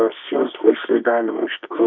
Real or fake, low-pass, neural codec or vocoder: fake; 7.2 kHz; codec, 24 kHz, 0.9 kbps, WavTokenizer, medium music audio release